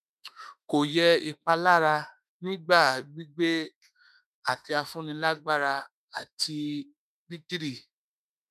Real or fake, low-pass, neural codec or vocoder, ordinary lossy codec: fake; 14.4 kHz; autoencoder, 48 kHz, 32 numbers a frame, DAC-VAE, trained on Japanese speech; none